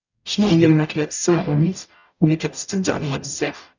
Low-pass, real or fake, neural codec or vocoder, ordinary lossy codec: 7.2 kHz; fake; codec, 44.1 kHz, 0.9 kbps, DAC; none